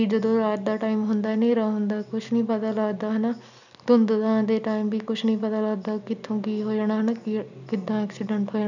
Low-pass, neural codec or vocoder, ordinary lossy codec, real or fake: 7.2 kHz; none; none; real